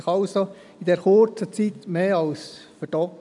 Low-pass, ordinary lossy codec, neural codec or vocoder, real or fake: 10.8 kHz; none; none; real